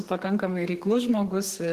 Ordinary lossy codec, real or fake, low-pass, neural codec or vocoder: Opus, 16 kbps; fake; 14.4 kHz; codec, 32 kHz, 1.9 kbps, SNAC